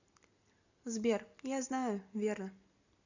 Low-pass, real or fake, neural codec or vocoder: 7.2 kHz; real; none